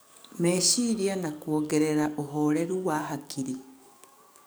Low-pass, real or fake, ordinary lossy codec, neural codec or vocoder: none; fake; none; codec, 44.1 kHz, 7.8 kbps, DAC